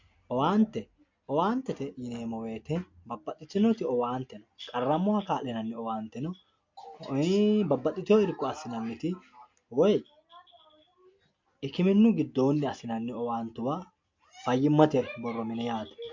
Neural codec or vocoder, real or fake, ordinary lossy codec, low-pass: none; real; MP3, 48 kbps; 7.2 kHz